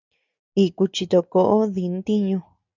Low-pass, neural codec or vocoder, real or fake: 7.2 kHz; none; real